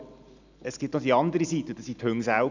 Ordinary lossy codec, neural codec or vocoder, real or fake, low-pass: none; none; real; 7.2 kHz